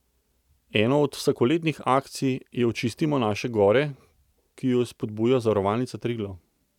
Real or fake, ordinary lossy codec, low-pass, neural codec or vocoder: real; none; 19.8 kHz; none